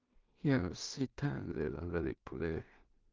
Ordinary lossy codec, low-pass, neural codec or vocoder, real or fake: Opus, 24 kbps; 7.2 kHz; codec, 16 kHz in and 24 kHz out, 0.4 kbps, LongCat-Audio-Codec, two codebook decoder; fake